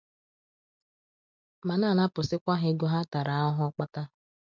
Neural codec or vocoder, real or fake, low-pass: none; real; 7.2 kHz